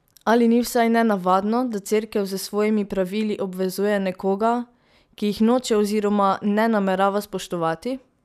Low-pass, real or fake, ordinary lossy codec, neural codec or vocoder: 14.4 kHz; real; none; none